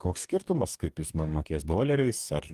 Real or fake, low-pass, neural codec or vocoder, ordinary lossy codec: fake; 14.4 kHz; codec, 44.1 kHz, 2.6 kbps, DAC; Opus, 32 kbps